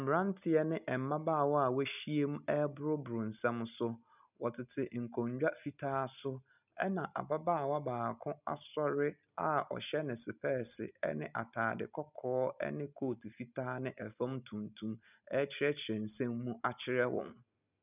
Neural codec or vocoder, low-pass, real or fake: none; 3.6 kHz; real